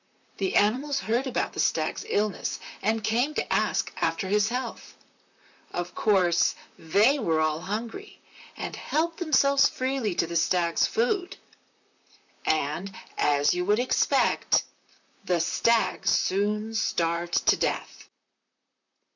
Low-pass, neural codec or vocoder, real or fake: 7.2 kHz; vocoder, 44.1 kHz, 128 mel bands, Pupu-Vocoder; fake